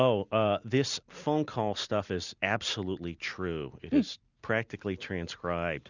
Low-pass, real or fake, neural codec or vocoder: 7.2 kHz; real; none